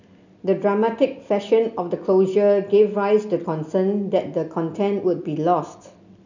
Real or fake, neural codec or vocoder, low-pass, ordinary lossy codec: real; none; 7.2 kHz; none